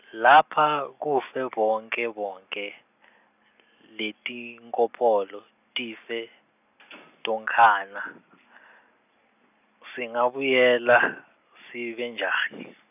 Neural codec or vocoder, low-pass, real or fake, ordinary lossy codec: none; 3.6 kHz; real; none